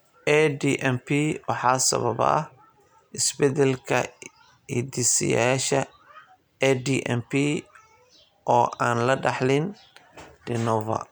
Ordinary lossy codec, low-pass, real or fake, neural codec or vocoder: none; none; real; none